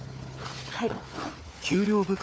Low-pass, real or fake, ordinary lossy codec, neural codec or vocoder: none; fake; none; codec, 16 kHz, 4 kbps, FunCodec, trained on Chinese and English, 50 frames a second